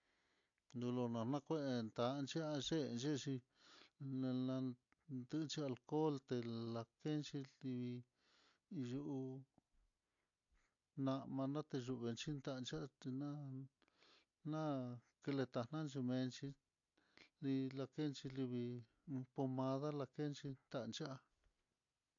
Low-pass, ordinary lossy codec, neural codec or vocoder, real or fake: 7.2 kHz; AAC, 64 kbps; none; real